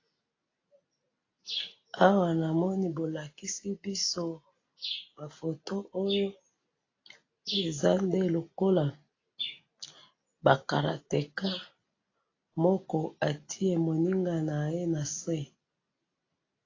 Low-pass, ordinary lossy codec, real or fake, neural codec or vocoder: 7.2 kHz; AAC, 32 kbps; real; none